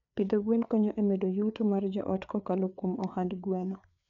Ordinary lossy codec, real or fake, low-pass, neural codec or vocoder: none; fake; 7.2 kHz; codec, 16 kHz, 4 kbps, FreqCodec, larger model